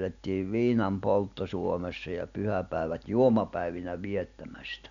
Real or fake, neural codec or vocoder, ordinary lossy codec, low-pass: real; none; none; 7.2 kHz